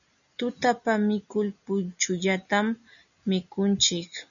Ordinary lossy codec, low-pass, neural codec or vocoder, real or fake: MP3, 96 kbps; 7.2 kHz; none; real